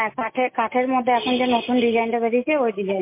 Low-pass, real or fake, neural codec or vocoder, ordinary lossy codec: 3.6 kHz; real; none; MP3, 24 kbps